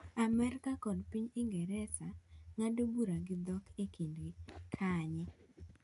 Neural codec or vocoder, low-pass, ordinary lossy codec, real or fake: none; 10.8 kHz; MP3, 64 kbps; real